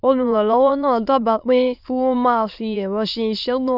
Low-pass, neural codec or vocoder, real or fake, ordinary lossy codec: 5.4 kHz; autoencoder, 22.05 kHz, a latent of 192 numbers a frame, VITS, trained on many speakers; fake; none